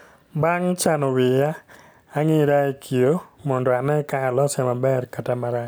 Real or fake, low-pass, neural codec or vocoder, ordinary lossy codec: real; none; none; none